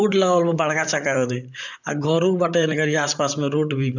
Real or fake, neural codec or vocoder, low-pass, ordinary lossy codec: fake; vocoder, 44.1 kHz, 128 mel bands, Pupu-Vocoder; 7.2 kHz; none